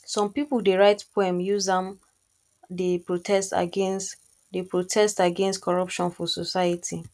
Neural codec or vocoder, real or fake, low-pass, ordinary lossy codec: none; real; none; none